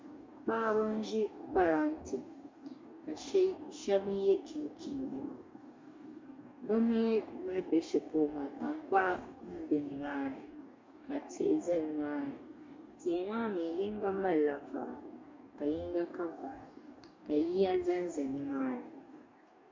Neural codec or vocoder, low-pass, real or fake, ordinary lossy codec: codec, 44.1 kHz, 2.6 kbps, DAC; 7.2 kHz; fake; MP3, 64 kbps